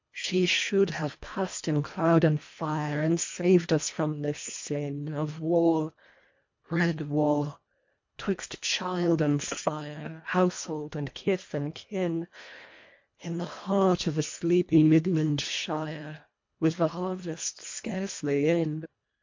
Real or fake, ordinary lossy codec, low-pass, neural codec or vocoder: fake; MP3, 48 kbps; 7.2 kHz; codec, 24 kHz, 1.5 kbps, HILCodec